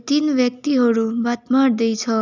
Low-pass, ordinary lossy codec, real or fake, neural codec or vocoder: 7.2 kHz; none; real; none